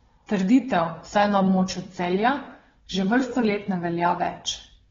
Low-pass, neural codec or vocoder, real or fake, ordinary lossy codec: 7.2 kHz; codec, 16 kHz, 4 kbps, FunCodec, trained on Chinese and English, 50 frames a second; fake; AAC, 24 kbps